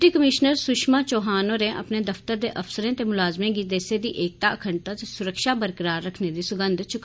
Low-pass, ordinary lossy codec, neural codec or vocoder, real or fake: none; none; none; real